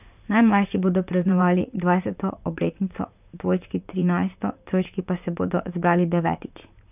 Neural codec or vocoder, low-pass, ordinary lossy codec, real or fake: vocoder, 44.1 kHz, 128 mel bands, Pupu-Vocoder; 3.6 kHz; none; fake